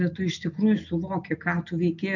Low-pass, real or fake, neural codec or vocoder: 7.2 kHz; real; none